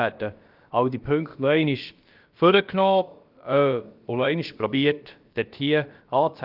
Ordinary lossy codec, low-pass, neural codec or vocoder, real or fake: Opus, 32 kbps; 5.4 kHz; codec, 16 kHz, about 1 kbps, DyCAST, with the encoder's durations; fake